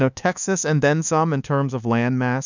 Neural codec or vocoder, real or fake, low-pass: codec, 24 kHz, 1.2 kbps, DualCodec; fake; 7.2 kHz